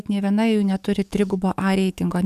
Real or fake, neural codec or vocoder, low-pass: fake; codec, 44.1 kHz, 7.8 kbps, DAC; 14.4 kHz